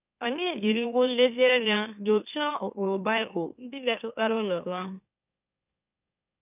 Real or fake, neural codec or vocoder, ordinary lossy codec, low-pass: fake; autoencoder, 44.1 kHz, a latent of 192 numbers a frame, MeloTTS; none; 3.6 kHz